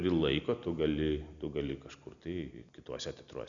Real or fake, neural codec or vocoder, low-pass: real; none; 7.2 kHz